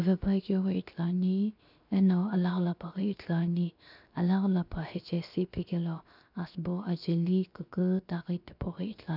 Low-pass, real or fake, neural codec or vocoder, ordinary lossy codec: 5.4 kHz; fake; codec, 16 kHz, 0.7 kbps, FocalCodec; none